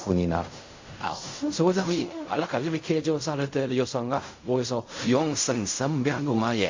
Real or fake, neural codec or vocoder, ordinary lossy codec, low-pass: fake; codec, 16 kHz in and 24 kHz out, 0.4 kbps, LongCat-Audio-Codec, fine tuned four codebook decoder; MP3, 48 kbps; 7.2 kHz